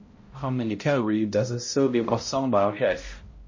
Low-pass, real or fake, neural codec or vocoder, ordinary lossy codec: 7.2 kHz; fake; codec, 16 kHz, 0.5 kbps, X-Codec, HuBERT features, trained on balanced general audio; MP3, 32 kbps